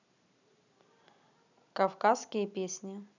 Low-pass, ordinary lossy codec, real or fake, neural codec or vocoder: 7.2 kHz; none; real; none